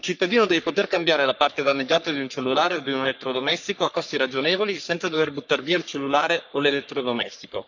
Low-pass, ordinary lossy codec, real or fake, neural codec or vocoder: 7.2 kHz; none; fake; codec, 44.1 kHz, 3.4 kbps, Pupu-Codec